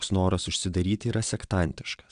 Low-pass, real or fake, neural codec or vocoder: 9.9 kHz; fake; vocoder, 22.05 kHz, 80 mel bands, WaveNeXt